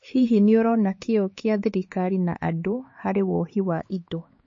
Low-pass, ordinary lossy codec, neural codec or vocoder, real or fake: 7.2 kHz; MP3, 32 kbps; codec, 16 kHz, 4 kbps, X-Codec, HuBERT features, trained on LibriSpeech; fake